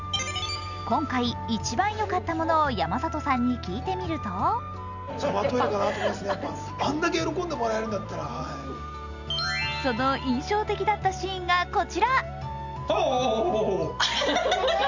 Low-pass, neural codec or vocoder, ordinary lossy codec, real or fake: 7.2 kHz; none; none; real